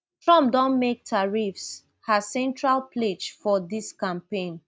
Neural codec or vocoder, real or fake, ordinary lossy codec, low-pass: none; real; none; none